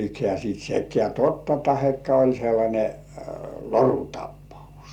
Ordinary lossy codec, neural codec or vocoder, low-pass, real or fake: Opus, 64 kbps; none; 19.8 kHz; real